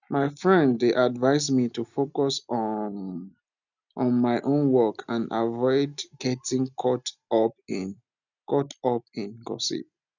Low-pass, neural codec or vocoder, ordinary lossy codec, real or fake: 7.2 kHz; none; none; real